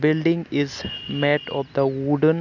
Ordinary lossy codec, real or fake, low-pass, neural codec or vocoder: none; real; 7.2 kHz; none